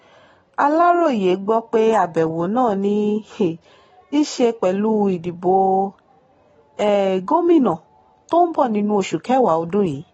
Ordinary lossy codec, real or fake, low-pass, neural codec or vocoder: AAC, 24 kbps; real; 19.8 kHz; none